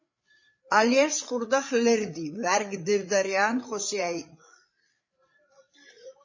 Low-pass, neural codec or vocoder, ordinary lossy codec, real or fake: 7.2 kHz; codec, 16 kHz, 8 kbps, FreqCodec, larger model; MP3, 32 kbps; fake